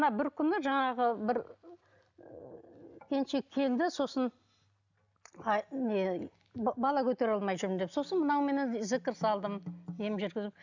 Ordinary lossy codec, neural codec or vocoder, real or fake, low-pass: none; none; real; 7.2 kHz